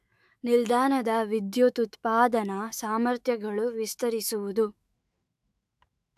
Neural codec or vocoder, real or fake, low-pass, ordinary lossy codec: autoencoder, 48 kHz, 128 numbers a frame, DAC-VAE, trained on Japanese speech; fake; 14.4 kHz; AAC, 96 kbps